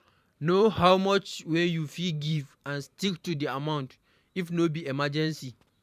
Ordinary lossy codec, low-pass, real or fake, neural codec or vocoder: none; 14.4 kHz; fake; vocoder, 44.1 kHz, 128 mel bands every 512 samples, BigVGAN v2